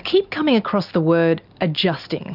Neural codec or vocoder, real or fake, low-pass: none; real; 5.4 kHz